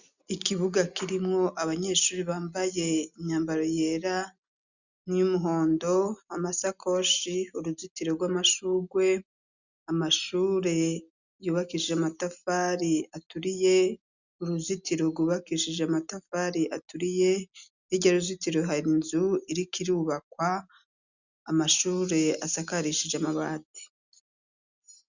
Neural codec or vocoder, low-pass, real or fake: none; 7.2 kHz; real